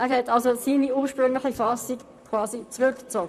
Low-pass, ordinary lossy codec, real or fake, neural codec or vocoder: 14.4 kHz; none; fake; vocoder, 44.1 kHz, 128 mel bands, Pupu-Vocoder